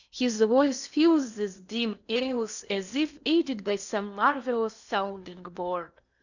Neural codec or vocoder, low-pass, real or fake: codec, 16 kHz in and 24 kHz out, 0.8 kbps, FocalCodec, streaming, 65536 codes; 7.2 kHz; fake